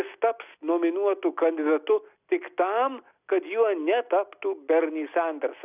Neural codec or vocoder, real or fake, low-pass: none; real; 3.6 kHz